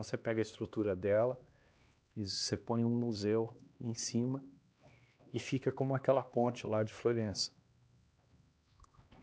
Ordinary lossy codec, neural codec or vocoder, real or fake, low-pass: none; codec, 16 kHz, 2 kbps, X-Codec, HuBERT features, trained on LibriSpeech; fake; none